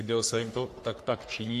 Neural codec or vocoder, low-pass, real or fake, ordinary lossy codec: codec, 44.1 kHz, 3.4 kbps, Pupu-Codec; 14.4 kHz; fake; AAC, 64 kbps